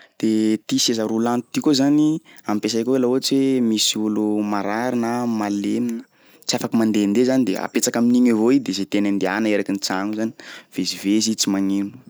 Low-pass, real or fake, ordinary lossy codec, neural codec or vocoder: none; real; none; none